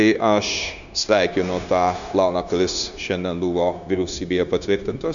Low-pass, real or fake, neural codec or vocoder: 7.2 kHz; fake; codec, 16 kHz, 0.9 kbps, LongCat-Audio-Codec